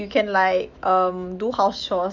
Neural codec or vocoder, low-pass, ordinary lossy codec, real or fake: autoencoder, 48 kHz, 128 numbers a frame, DAC-VAE, trained on Japanese speech; 7.2 kHz; none; fake